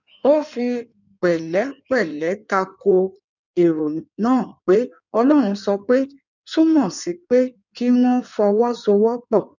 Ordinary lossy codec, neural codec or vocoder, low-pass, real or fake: none; codec, 16 kHz in and 24 kHz out, 1.1 kbps, FireRedTTS-2 codec; 7.2 kHz; fake